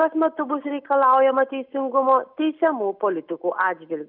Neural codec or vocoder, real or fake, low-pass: none; real; 5.4 kHz